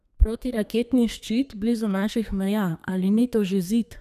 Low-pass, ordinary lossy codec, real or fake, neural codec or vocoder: 14.4 kHz; none; fake; codec, 32 kHz, 1.9 kbps, SNAC